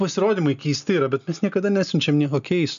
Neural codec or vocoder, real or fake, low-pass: none; real; 7.2 kHz